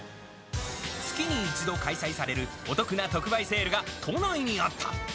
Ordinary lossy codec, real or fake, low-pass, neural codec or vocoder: none; real; none; none